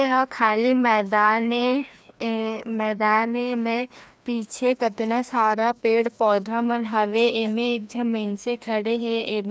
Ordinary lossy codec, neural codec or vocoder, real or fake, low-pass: none; codec, 16 kHz, 1 kbps, FreqCodec, larger model; fake; none